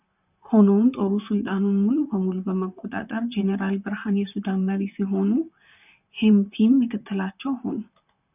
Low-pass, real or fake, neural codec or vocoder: 3.6 kHz; fake; vocoder, 44.1 kHz, 128 mel bands, Pupu-Vocoder